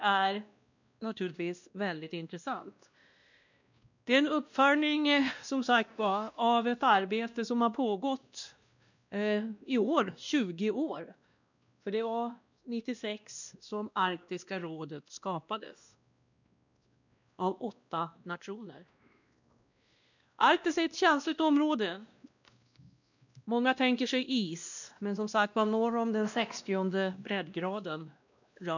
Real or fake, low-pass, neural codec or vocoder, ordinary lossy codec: fake; 7.2 kHz; codec, 16 kHz, 1 kbps, X-Codec, WavLM features, trained on Multilingual LibriSpeech; none